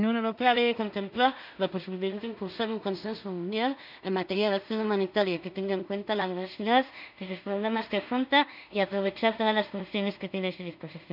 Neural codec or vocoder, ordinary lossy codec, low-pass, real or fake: codec, 16 kHz in and 24 kHz out, 0.4 kbps, LongCat-Audio-Codec, two codebook decoder; none; 5.4 kHz; fake